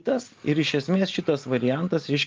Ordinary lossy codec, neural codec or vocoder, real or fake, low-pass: Opus, 32 kbps; none; real; 7.2 kHz